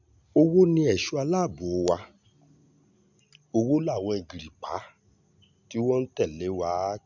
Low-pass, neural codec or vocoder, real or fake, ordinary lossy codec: 7.2 kHz; none; real; none